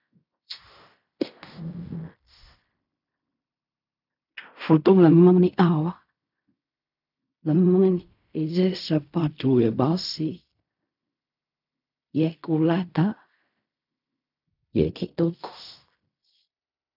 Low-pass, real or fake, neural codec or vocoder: 5.4 kHz; fake; codec, 16 kHz in and 24 kHz out, 0.4 kbps, LongCat-Audio-Codec, fine tuned four codebook decoder